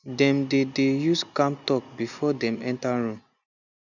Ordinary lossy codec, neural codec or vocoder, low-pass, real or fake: none; none; 7.2 kHz; real